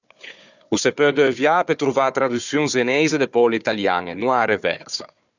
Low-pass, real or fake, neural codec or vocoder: 7.2 kHz; fake; codec, 16 kHz, 4 kbps, FunCodec, trained on Chinese and English, 50 frames a second